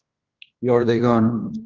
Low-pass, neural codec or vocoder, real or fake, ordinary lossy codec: 7.2 kHz; codec, 16 kHz in and 24 kHz out, 0.9 kbps, LongCat-Audio-Codec, fine tuned four codebook decoder; fake; Opus, 32 kbps